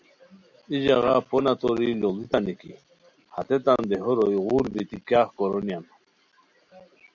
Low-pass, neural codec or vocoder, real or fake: 7.2 kHz; none; real